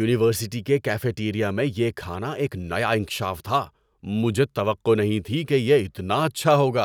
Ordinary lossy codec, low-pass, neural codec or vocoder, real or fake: none; 19.8 kHz; none; real